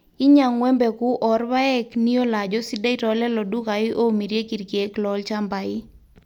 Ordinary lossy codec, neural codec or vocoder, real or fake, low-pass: none; none; real; 19.8 kHz